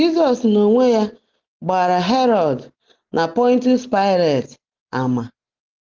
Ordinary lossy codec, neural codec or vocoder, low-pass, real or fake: Opus, 16 kbps; none; 7.2 kHz; real